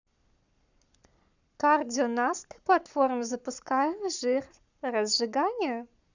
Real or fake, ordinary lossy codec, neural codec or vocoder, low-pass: fake; none; codec, 44.1 kHz, 7.8 kbps, Pupu-Codec; 7.2 kHz